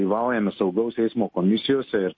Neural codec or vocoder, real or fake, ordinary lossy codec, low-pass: none; real; MP3, 32 kbps; 7.2 kHz